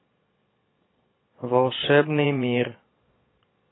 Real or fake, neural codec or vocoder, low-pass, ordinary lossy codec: fake; vocoder, 22.05 kHz, 80 mel bands, Vocos; 7.2 kHz; AAC, 16 kbps